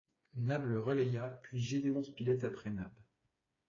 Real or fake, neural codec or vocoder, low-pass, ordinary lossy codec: fake; codec, 16 kHz, 4 kbps, FreqCodec, smaller model; 7.2 kHz; AAC, 32 kbps